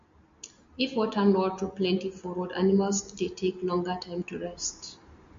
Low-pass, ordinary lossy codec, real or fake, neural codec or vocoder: 7.2 kHz; MP3, 48 kbps; real; none